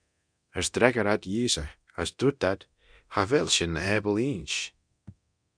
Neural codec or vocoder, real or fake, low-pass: codec, 24 kHz, 0.9 kbps, DualCodec; fake; 9.9 kHz